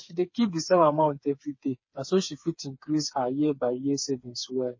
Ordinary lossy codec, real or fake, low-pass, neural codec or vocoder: MP3, 32 kbps; fake; 7.2 kHz; codec, 16 kHz, 4 kbps, FreqCodec, smaller model